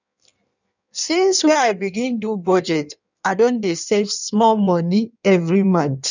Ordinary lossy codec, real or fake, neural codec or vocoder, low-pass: none; fake; codec, 16 kHz in and 24 kHz out, 1.1 kbps, FireRedTTS-2 codec; 7.2 kHz